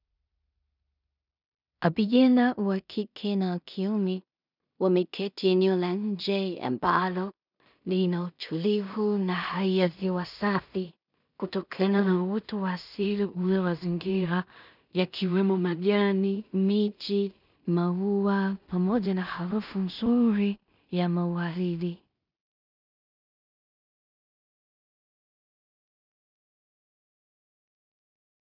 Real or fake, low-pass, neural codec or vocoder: fake; 5.4 kHz; codec, 16 kHz in and 24 kHz out, 0.4 kbps, LongCat-Audio-Codec, two codebook decoder